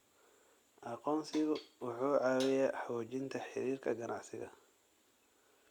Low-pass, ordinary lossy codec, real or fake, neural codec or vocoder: 19.8 kHz; Opus, 64 kbps; real; none